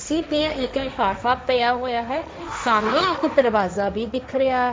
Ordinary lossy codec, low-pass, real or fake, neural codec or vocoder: none; none; fake; codec, 16 kHz, 1.1 kbps, Voila-Tokenizer